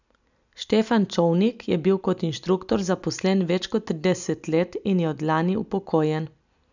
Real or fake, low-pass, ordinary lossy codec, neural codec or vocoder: real; 7.2 kHz; none; none